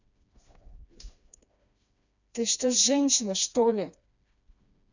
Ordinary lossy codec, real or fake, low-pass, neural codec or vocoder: none; fake; 7.2 kHz; codec, 16 kHz, 2 kbps, FreqCodec, smaller model